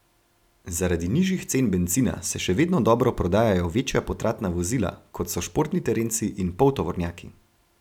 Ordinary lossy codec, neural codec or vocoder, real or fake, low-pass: none; none; real; 19.8 kHz